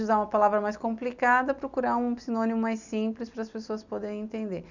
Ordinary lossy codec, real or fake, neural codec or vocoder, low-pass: none; real; none; 7.2 kHz